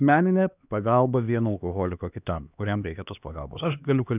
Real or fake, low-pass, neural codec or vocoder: fake; 3.6 kHz; codec, 16 kHz, 2 kbps, X-Codec, HuBERT features, trained on LibriSpeech